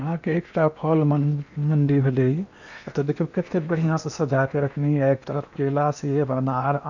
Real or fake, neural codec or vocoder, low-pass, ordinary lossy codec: fake; codec, 16 kHz in and 24 kHz out, 0.8 kbps, FocalCodec, streaming, 65536 codes; 7.2 kHz; none